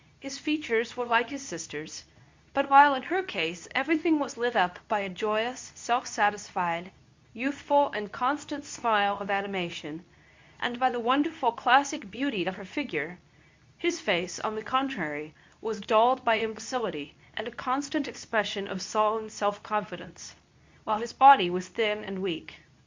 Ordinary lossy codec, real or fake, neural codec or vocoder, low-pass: MP3, 48 kbps; fake; codec, 24 kHz, 0.9 kbps, WavTokenizer, medium speech release version 2; 7.2 kHz